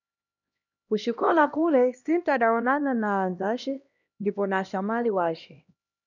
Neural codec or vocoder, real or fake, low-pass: codec, 16 kHz, 1 kbps, X-Codec, HuBERT features, trained on LibriSpeech; fake; 7.2 kHz